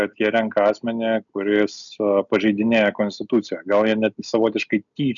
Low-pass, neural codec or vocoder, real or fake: 7.2 kHz; none; real